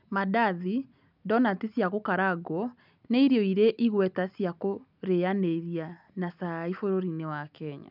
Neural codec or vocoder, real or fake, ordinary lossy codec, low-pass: none; real; none; 5.4 kHz